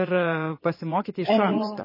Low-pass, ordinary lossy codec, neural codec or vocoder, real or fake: 5.4 kHz; MP3, 24 kbps; vocoder, 22.05 kHz, 80 mel bands, Vocos; fake